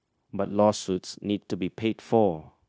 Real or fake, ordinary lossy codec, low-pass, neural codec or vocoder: fake; none; none; codec, 16 kHz, 0.9 kbps, LongCat-Audio-Codec